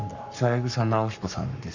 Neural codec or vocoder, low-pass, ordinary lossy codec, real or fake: codec, 44.1 kHz, 7.8 kbps, Pupu-Codec; 7.2 kHz; none; fake